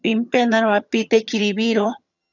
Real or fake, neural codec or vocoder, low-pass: fake; vocoder, 22.05 kHz, 80 mel bands, HiFi-GAN; 7.2 kHz